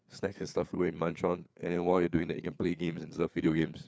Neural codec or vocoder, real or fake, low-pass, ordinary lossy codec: codec, 16 kHz, 4 kbps, FreqCodec, larger model; fake; none; none